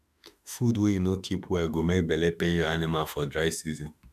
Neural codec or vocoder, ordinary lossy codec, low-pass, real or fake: autoencoder, 48 kHz, 32 numbers a frame, DAC-VAE, trained on Japanese speech; none; 14.4 kHz; fake